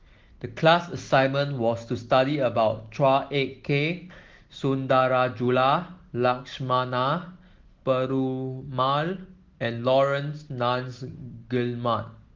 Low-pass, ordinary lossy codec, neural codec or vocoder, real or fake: 7.2 kHz; Opus, 16 kbps; none; real